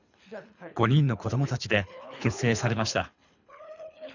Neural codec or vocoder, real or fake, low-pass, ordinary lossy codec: codec, 24 kHz, 3 kbps, HILCodec; fake; 7.2 kHz; none